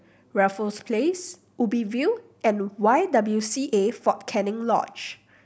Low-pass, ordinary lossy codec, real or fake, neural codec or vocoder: none; none; real; none